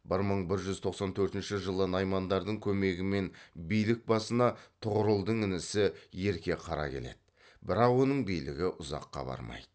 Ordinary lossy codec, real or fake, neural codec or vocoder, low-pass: none; real; none; none